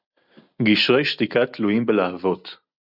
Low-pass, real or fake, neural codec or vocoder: 5.4 kHz; real; none